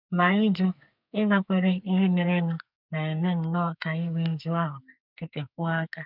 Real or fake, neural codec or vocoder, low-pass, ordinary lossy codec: fake; codec, 44.1 kHz, 2.6 kbps, SNAC; 5.4 kHz; none